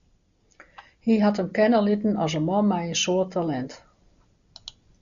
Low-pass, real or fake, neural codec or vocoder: 7.2 kHz; real; none